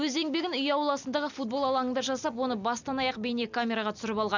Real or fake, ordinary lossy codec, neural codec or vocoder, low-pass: real; none; none; 7.2 kHz